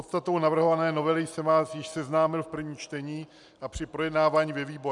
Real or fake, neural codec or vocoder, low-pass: real; none; 10.8 kHz